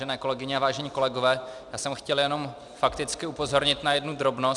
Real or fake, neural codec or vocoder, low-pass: real; none; 10.8 kHz